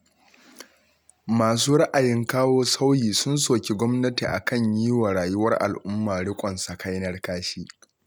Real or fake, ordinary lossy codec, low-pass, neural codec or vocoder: real; none; none; none